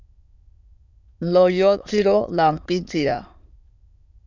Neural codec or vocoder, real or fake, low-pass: autoencoder, 22.05 kHz, a latent of 192 numbers a frame, VITS, trained on many speakers; fake; 7.2 kHz